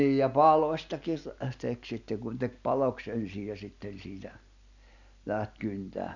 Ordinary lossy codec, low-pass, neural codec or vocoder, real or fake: none; 7.2 kHz; none; real